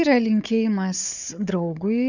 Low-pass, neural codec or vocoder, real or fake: 7.2 kHz; codec, 16 kHz, 4 kbps, FunCodec, trained on Chinese and English, 50 frames a second; fake